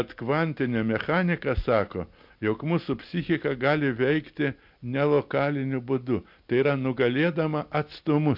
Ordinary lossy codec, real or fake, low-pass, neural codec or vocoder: MP3, 48 kbps; real; 5.4 kHz; none